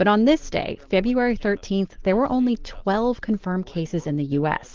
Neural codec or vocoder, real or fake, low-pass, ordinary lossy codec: none; real; 7.2 kHz; Opus, 16 kbps